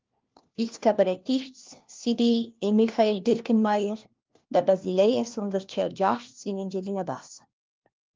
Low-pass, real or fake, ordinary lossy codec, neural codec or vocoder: 7.2 kHz; fake; Opus, 32 kbps; codec, 16 kHz, 1 kbps, FunCodec, trained on LibriTTS, 50 frames a second